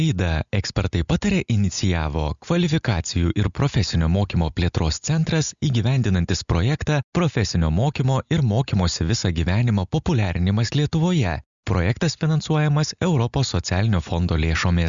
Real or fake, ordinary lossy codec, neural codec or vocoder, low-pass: real; Opus, 64 kbps; none; 7.2 kHz